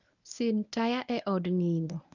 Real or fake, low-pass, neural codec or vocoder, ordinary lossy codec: fake; 7.2 kHz; codec, 24 kHz, 0.9 kbps, WavTokenizer, medium speech release version 1; none